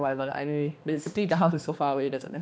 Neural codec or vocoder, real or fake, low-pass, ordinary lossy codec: codec, 16 kHz, 2 kbps, X-Codec, HuBERT features, trained on balanced general audio; fake; none; none